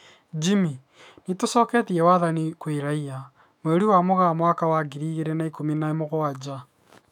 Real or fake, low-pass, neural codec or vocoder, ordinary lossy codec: fake; 19.8 kHz; autoencoder, 48 kHz, 128 numbers a frame, DAC-VAE, trained on Japanese speech; none